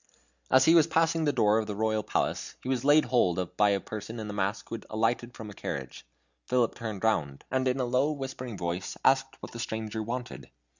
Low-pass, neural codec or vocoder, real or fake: 7.2 kHz; none; real